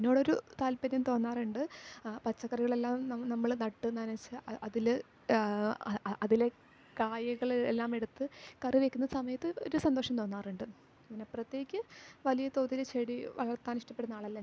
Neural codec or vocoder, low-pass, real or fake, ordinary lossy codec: none; none; real; none